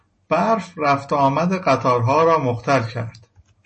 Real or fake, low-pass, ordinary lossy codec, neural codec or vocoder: real; 10.8 kHz; MP3, 32 kbps; none